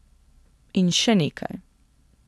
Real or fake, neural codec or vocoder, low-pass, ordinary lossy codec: real; none; none; none